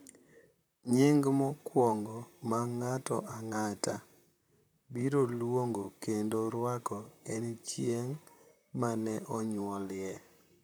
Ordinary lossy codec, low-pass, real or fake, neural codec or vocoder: none; none; fake; vocoder, 44.1 kHz, 128 mel bands, Pupu-Vocoder